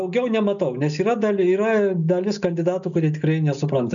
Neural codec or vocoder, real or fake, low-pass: none; real; 7.2 kHz